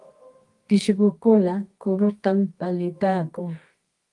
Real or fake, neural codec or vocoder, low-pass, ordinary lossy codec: fake; codec, 24 kHz, 0.9 kbps, WavTokenizer, medium music audio release; 10.8 kHz; Opus, 24 kbps